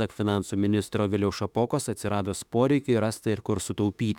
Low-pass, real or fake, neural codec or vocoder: 19.8 kHz; fake; autoencoder, 48 kHz, 32 numbers a frame, DAC-VAE, trained on Japanese speech